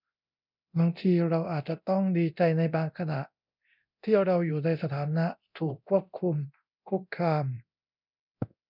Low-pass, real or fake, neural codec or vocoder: 5.4 kHz; fake; codec, 24 kHz, 0.9 kbps, DualCodec